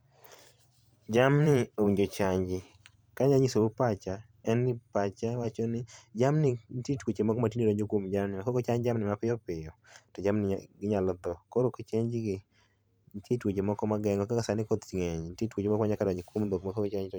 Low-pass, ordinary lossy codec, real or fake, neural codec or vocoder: none; none; fake; vocoder, 44.1 kHz, 128 mel bands every 512 samples, BigVGAN v2